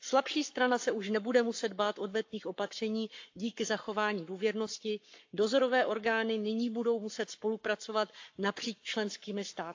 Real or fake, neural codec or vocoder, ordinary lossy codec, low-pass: fake; codec, 44.1 kHz, 7.8 kbps, Pupu-Codec; AAC, 48 kbps; 7.2 kHz